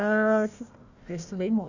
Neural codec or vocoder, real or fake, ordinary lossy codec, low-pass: codec, 16 kHz, 1 kbps, FunCodec, trained on Chinese and English, 50 frames a second; fake; Opus, 64 kbps; 7.2 kHz